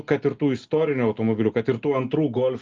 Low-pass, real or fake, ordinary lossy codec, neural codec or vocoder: 7.2 kHz; real; Opus, 32 kbps; none